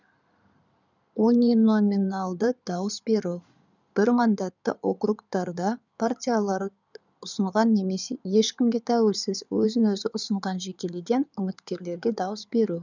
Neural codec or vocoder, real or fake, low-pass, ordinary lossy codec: codec, 16 kHz in and 24 kHz out, 2.2 kbps, FireRedTTS-2 codec; fake; 7.2 kHz; none